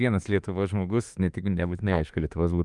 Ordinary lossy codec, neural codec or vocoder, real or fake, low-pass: Opus, 32 kbps; autoencoder, 48 kHz, 32 numbers a frame, DAC-VAE, trained on Japanese speech; fake; 10.8 kHz